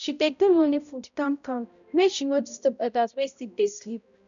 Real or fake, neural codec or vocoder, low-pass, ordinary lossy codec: fake; codec, 16 kHz, 0.5 kbps, X-Codec, HuBERT features, trained on balanced general audio; 7.2 kHz; none